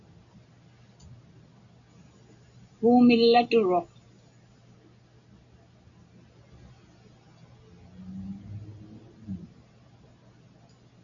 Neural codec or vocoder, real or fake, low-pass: none; real; 7.2 kHz